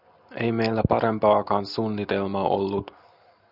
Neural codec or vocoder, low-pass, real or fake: none; 5.4 kHz; real